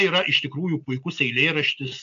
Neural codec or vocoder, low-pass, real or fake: none; 7.2 kHz; real